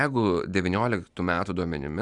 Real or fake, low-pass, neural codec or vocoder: real; 10.8 kHz; none